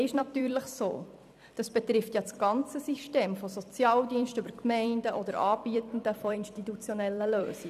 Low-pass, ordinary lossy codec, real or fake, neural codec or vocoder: 14.4 kHz; none; fake; vocoder, 44.1 kHz, 128 mel bands every 256 samples, BigVGAN v2